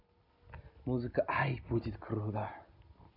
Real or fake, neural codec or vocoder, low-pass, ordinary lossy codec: real; none; 5.4 kHz; none